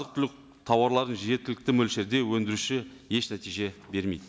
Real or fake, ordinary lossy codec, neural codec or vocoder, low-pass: real; none; none; none